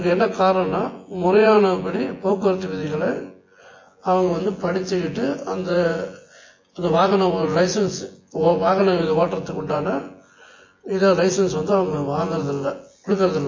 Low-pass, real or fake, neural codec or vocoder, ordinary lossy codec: 7.2 kHz; fake; vocoder, 24 kHz, 100 mel bands, Vocos; MP3, 32 kbps